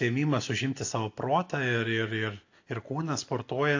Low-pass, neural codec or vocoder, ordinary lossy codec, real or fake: 7.2 kHz; none; AAC, 48 kbps; real